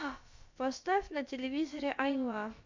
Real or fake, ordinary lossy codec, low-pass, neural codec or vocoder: fake; MP3, 64 kbps; 7.2 kHz; codec, 16 kHz, about 1 kbps, DyCAST, with the encoder's durations